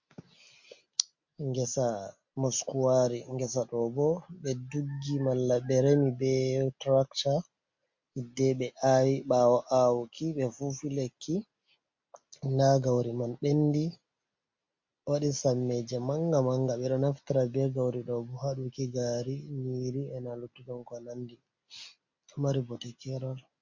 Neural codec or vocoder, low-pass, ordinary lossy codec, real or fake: none; 7.2 kHz; MP3, 48 kbps; real